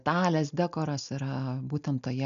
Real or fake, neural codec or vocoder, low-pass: real; none; 7.2 kHz